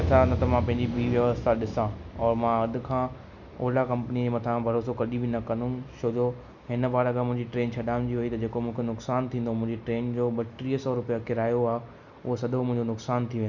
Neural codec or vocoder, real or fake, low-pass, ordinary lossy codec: none; real; 7.2 kHz; none